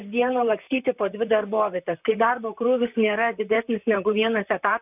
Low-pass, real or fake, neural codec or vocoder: 3.6 kHz; fake; vocoder, 44.1 kHz, 128 mel bands, Pupu-Vocoder